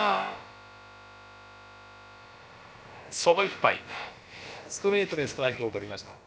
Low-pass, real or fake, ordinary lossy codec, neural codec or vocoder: none; fake; none; codec, 16 kHz, about 1 kbps, DyCAST, with the encoder's durations